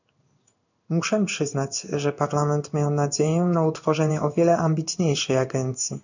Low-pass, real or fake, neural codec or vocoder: 7.2 kHz; fake; codec, 16 kHz in and 24 kHz out, 1 kbps, XY-Tokenizer